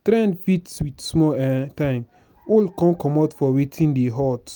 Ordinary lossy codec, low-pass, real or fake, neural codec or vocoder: none; none; real; none